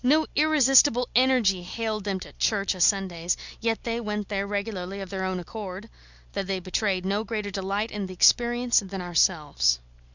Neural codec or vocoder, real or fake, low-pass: none; real; 7.2 kHz